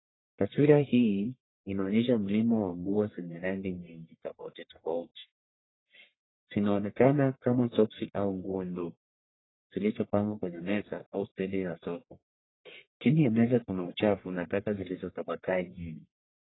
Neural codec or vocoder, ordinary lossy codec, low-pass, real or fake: codec, 44.1 kHz, 1.7 kbps, Pupu-Codec; AAC, 16 kbps; 7.2 kHz; fake